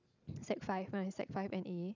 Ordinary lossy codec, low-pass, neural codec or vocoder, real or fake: none; 7.2 kHz; none; real